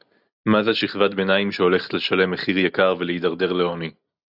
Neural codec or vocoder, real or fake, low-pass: none; real; 5.4 kHz